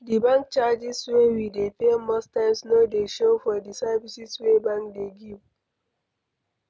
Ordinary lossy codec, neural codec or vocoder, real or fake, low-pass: none; none; real; none